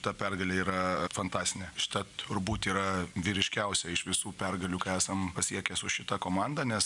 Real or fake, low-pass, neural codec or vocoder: real; 10.8 kHz; none